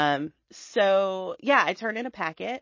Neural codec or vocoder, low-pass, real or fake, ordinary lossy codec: none; 7.2 kHz; real; MP3, 32 kbps